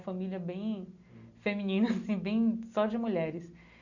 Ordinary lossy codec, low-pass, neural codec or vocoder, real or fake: none; 7.2 kHz; none; real